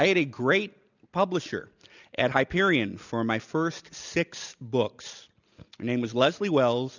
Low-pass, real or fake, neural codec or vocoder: 7.2 kHz; real; none